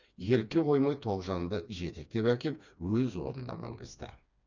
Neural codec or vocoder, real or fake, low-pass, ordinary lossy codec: codec, 16 kHz, 2 kbps, FreqCodec, smaller model; fake; 7.2 kHz; none